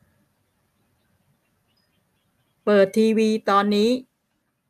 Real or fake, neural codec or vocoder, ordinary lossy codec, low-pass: real; none; none; 14.4 kHz